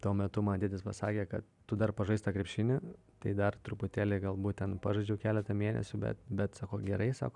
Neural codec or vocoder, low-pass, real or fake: none; 10.8 kHz; real